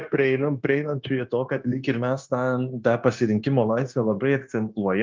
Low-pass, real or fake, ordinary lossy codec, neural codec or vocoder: 7.2 kHz; fake; Opus, 32 kbps; codec, 16 kHz, 0.9 kbps, LongCat-Audio-Codec